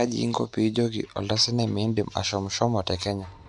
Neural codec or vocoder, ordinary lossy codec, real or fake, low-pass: none; none; real; 10.8 kHz